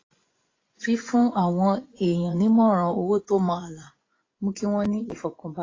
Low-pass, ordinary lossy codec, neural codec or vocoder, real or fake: 7.2 kHz; AAC, 32 kbps; vocoder, 24 kHz, 100 mel bands, Vocos; fake